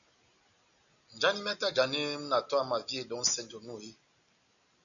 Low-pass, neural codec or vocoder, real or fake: 7.2 kHz; none; real